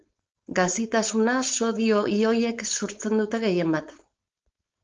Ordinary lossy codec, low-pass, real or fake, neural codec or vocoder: Opus, 24 kbps; 7.2 kHz; fake; codec, 16 kHz, 4.8 kbps, FACodec